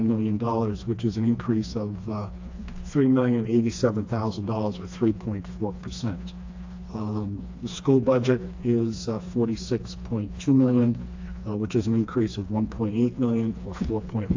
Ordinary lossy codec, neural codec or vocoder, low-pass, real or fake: AAC, 48 kbps; codec, 16 kHz, 2 kbps, FreqCodec, smaller model; 7.2 kHz; fake